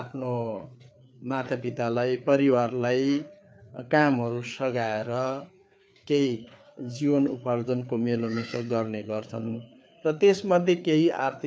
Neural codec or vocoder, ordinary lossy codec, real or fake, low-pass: codec, 16 kHz, 4 kbps, FreqCodec, larger model; none; fake; none